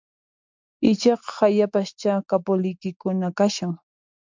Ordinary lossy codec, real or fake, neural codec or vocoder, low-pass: MP3, 64 kbps; real; none; 7.2 kHz